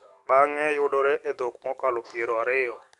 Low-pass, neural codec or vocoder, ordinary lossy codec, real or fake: 10.8 kHz; codec, 44.1 kHz, 7.8 kbps, DAC; none; fake